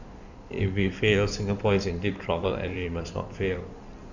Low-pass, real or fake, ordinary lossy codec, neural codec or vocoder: 7.2 kHz; fake; none; codec, 16 kHz in and 24 kHz out, 2.2 kbps, FireRedTTS-2 codec